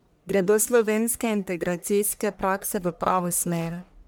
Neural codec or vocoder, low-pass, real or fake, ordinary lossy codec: codec, 44.1 kHz, 1.7 kbps, Pupu-Codec; none; fake; none